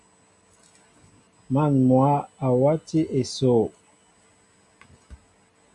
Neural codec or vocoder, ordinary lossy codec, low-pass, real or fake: none; MP3, 96 kbps; 10.8 kHz; real